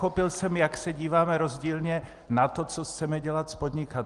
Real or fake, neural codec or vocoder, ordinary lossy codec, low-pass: real; none; Opus, 24 kbps; 10.8 kHz